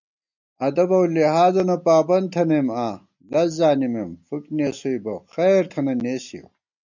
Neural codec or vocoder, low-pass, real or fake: none; 7.2 kHz; real